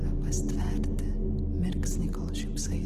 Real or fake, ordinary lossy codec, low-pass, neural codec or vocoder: real; Opus, 16 kbps; 14.4 kHz; none